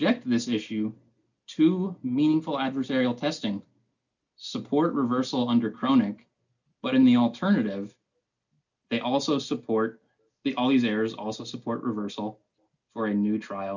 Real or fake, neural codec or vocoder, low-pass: real; none; 7.2 kHz